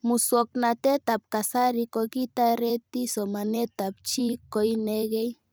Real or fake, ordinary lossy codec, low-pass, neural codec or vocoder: fake; none; none; vocoder, 44.1 kHz, 128 mel bands every 256 samples, BigVGAN v2